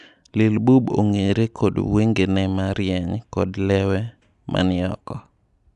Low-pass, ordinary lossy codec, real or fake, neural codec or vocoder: 10.8 kHz; none; real; none